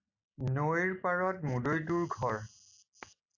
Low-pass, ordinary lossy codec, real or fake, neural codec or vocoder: 7.2 kHz; AAC, 48 kbps; real; none